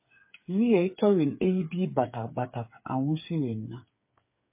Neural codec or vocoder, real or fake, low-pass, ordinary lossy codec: codec, 16 kHz, 8 kbps, FreqCodec, smaller model; fake; 3.6 kHz; MP3, 24 kbps